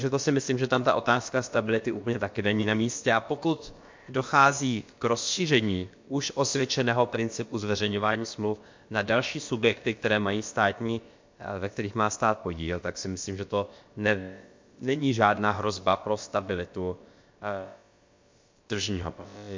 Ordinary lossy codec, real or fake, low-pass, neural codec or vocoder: MP3, 48 kbps; fake; 7.2 kHz; codec, 16 kHz, about 1 kbps, DyCAST, with the encoder's durations